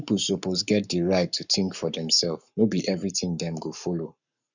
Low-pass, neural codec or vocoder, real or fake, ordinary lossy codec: 7.2 kHz; codec, 44.1 kHz, 7.8 kbps, Pupu-Codec; fake; none